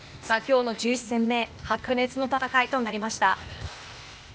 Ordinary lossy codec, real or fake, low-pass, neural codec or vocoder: none; fake; none; codec, 16 kHz, 0.8 kbps, ZipCodec